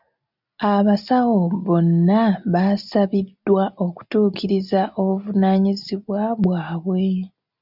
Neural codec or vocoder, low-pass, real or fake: none; 5.4 kHz; real